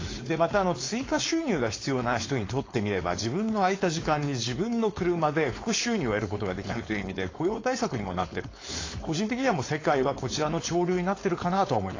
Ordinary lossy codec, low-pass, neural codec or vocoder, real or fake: AAC, 32 kbps; 7.2 kHz; codec, 16 kHz, 4.8 kbps, FACodec; fake